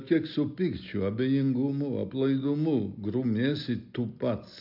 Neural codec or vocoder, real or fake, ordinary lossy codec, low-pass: none; real; MP3, 48 kbps; 5.4 kHz